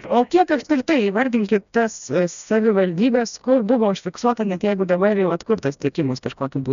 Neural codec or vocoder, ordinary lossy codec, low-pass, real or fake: codec, 16 kHz, 1 kbps, FreqCodec, smaller model; MP3, 96 kbps; 7.2 kHz; fake